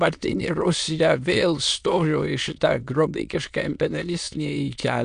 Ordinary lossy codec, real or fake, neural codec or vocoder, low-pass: MP3, 96 kbps; fake; autoencoder, 22.05 kHz, a latent of 192 numbers a frame, VITS, trained on many speakers; 9.9 kHz